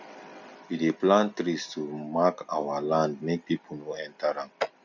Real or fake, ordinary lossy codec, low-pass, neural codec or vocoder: real; none; 7.2 kHz; none